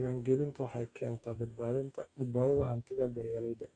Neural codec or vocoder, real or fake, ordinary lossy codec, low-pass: codec, 44.1 kHz, 2.6 kbps, DAC; fake; MP3, 64 kbps; 9.9 kHz